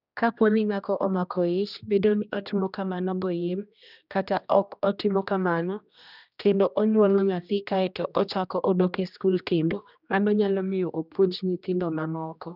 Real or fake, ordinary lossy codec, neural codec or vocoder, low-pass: fake; none; codec, 16 kHz, 1 kbps, X-Codec, HuBERT features, trained on general audio; 5.4 kHz